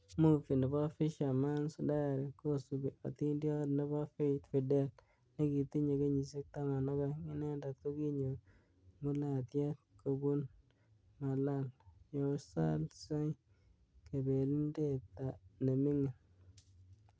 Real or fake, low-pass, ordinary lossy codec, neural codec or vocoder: real; none; none; none